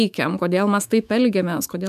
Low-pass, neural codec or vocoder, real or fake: 14.4 kHz; autoencoder, 48 kHz, 128 numbers a frame, DAC-VAE, trained on Japanese speech; fake